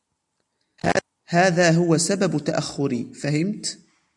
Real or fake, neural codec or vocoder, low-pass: real; none; 10.8 kHz